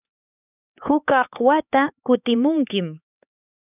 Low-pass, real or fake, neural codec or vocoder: 3.6 kHz; fake; codec, 16 kHz, 4 kbps, X-Codec, HuBERT features, trained on LibriSpeech